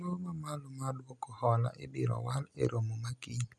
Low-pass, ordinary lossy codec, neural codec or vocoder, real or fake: none; none; none; real